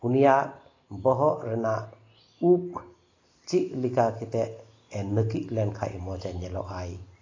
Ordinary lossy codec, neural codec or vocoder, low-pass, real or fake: AAC, 32 kbps; none; 7.2 kHz; real